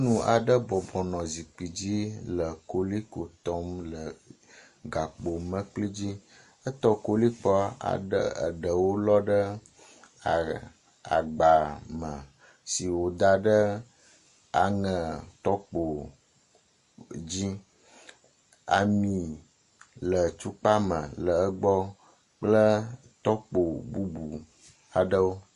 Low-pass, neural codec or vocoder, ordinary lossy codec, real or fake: 14.4 kHz; none; MP3, 48 kbps; real